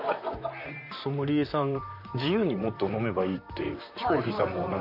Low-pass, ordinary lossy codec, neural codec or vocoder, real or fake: 5.4 kHz; none; vocoder, 44.1 kHz, 128 mel bands, Pupu-Vocoder; fake